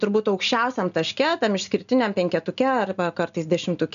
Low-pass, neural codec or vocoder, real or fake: 7.2 kHz; none; real